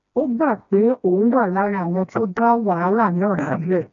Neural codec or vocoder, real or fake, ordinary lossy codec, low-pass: codec, 16 kHz, 1 kbps, FreqCodec, smaller model; fake; none; 7.2 kHz